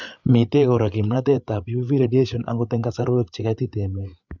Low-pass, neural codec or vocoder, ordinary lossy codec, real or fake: 7.2 kHz; codec, 16 kHz, 8 kbps, FreqCodec, larger model; none; fake